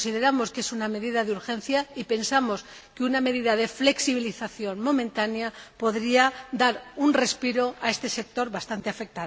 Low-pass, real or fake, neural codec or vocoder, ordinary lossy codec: none; real; none; none